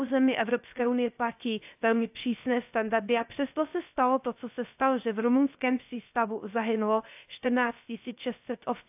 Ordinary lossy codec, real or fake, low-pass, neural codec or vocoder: AAC, 32 kbps; fake; 3.6 kHz; codec, 16 kHz, 0.3 kbps, FocalCodec